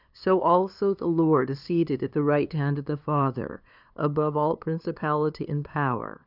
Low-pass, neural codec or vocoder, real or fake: 5.4 kHz; codec, 16 kHz, 2 kbps, FunCodec, trained on LibriTTS, 25 frames a second; fake